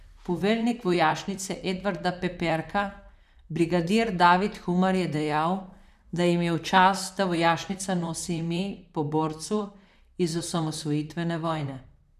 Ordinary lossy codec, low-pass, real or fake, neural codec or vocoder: none; 14.4 kHz; fake; vocoder, 44.1 kHz, 128 mel bands, Pupu-Vocoder